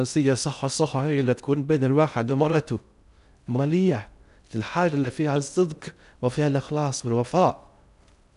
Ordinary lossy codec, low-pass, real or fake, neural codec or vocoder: none; 10.8 kHz; fake; codec, 16 kHz in and 24 kHz out, 0.6 kbps, FocalCodec, streaming, 2048 codes